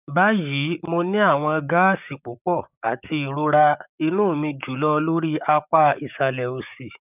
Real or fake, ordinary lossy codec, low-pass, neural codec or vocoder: fake; none; 3.6 kHz; codec, 16 kHz, 6 kbps, DAC